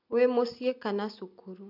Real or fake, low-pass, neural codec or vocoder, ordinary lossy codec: real; 5.4 kHz; none; none